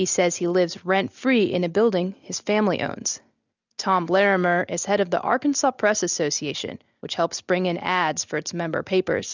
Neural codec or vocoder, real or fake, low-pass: none; real; 7.2 kHz